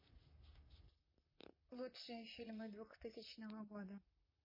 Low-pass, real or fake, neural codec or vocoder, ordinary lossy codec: 5.4 kHz; fake; vocoder, 44.1 kHz, 128 mel bands, Pupu-Vocoder; MP3, 24 kbps